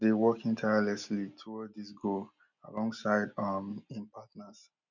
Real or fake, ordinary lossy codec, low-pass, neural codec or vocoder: real; none; 7.2 kHz; none